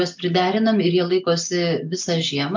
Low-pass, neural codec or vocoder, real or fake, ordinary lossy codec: 7.2 kHz; none; real; MP3, 64 kbps